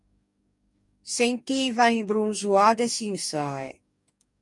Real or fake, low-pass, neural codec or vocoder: fake; 10.8 kHz; codec, 44.1 kHz, 2.6 kbps, DAC